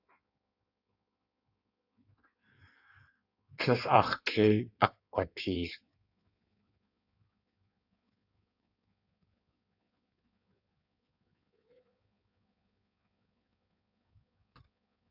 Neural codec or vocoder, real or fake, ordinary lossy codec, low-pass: codec, 16 kHz in and 24 kHz out, 1.1 kbps, FireRedTTS-2 codec; fake; AAC, 48 kbps; 5.4 kHz